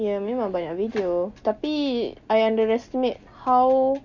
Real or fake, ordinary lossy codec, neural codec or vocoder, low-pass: real; none; none; 7.2 kHz